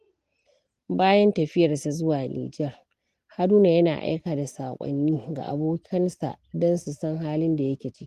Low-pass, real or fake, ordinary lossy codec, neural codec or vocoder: 14.4 kHz; real; Opus, 24 kbps; none